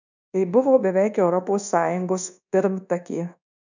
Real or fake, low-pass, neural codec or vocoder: fake; 7.2 kHz; codec, 24 kHz, 1.2 kbps, DualCodec